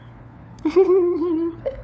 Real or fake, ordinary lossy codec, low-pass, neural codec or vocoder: fake; none; none; codec, 16 kHz, 4 kbps, FunCodec, trained on LibriTTS, 50 frames a second